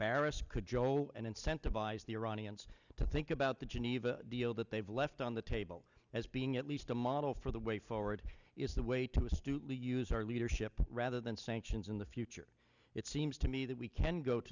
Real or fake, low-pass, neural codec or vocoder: real; 7.2 kHz; none